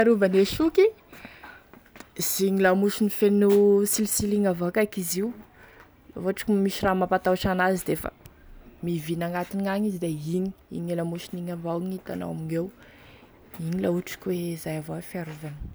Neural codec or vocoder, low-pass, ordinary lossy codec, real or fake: none; none; none; real